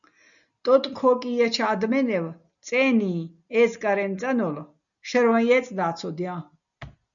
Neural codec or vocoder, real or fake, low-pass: none; real; 7.2 kHz